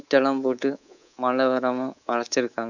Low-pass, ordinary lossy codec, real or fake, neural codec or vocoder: 7.2 kHz; none; fake; codec, 24 kHz, 3.1 kbps, DualCodec